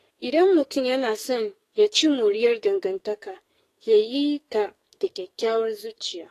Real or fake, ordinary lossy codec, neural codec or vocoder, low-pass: fake; AAC, 48 kbps; codec, 44.1 kHz, 2.6 kbps, SNAC; 14.4 kHz